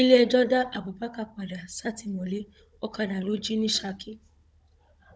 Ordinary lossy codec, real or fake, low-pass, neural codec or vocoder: none; fake; none; codec, 16 kHz, 16 kbps, FunCodec, trained on Chinese and English, 50 frames a second